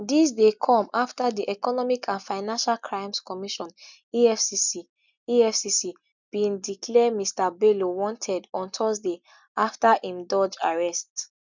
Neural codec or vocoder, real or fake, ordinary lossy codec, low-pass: none; real; none; 7.2 kHz